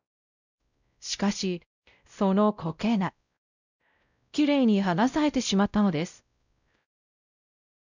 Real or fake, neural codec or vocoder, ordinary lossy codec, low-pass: fake; codec, 16 kHz, 0.5 kbps, X-Codec, WavLM features, trained on Multilingual LibriSpeech; none; 7.2 kHz